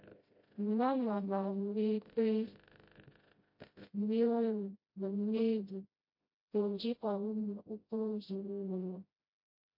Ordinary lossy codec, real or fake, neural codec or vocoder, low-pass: MP3, 32 kbps; fake; codec, 16 kHz, 0.5 kbps, FreqCodec, smaller model; 5.4 kHz